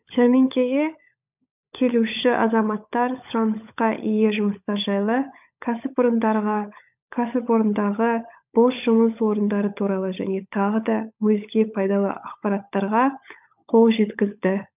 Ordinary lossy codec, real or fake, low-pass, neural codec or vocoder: none; fake; 3.6 kHz; codec, 16 kHz, 16 kbps, FunCodec, trained on LibriTTS, 50 frames a second